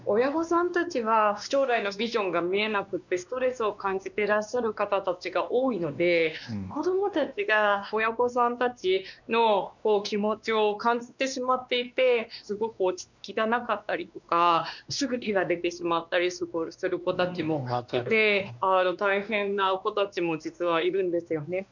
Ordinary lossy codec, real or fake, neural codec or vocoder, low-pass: none; fake; codec, 16 kHz, 2 kbps, X-Codec, WavLM features, trained on Multilingual LibriSpeech; 7.2 kHz